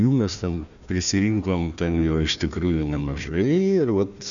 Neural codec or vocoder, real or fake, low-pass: codec, 16 kHz, 1 kbps, FunCodec, trained on Chinese and English, 50 frames a second; fake; 7.2 kHz